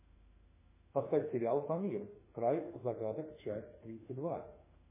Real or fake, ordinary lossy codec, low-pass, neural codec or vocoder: fake; MP3, 16 kbps; 3.6 kHz; autoencoder, 48 kHz, 32 numbers a frame, DAC-VAE, trained on Japanese speech